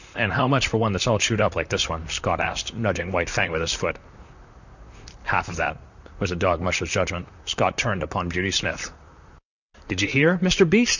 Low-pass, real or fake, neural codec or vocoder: 7.2 kHz; fake; vocoder, 44.1 kHz, 128 mel bands, Pupu-Vocoder